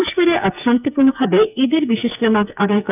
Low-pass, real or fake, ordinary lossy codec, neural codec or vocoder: 3.6 kHz; fake; none; codec, 44.1 kHz, 2.6 kbps, SNAC